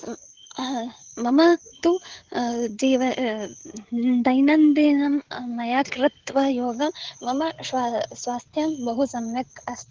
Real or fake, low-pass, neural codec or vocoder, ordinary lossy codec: fake; 7.2 kHz; codec, 16 kHz, 16 kbps, FreqCodec, smaller model; Opus, 16 kbps